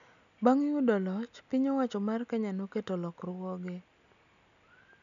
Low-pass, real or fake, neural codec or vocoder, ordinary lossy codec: 7.2 kHz; real; none; MP3, 96 kbps